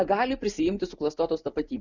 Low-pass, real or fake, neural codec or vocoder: 7.2 kHz; real; none